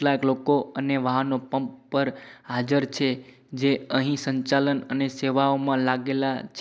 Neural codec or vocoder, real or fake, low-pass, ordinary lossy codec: none; real; none; none